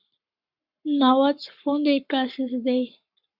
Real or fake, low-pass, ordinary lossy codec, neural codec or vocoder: fake; 5.4 kHz; Opus, 64 kbps; codec, 44.1 kHz, 7.8 kbps, Pupu-Codec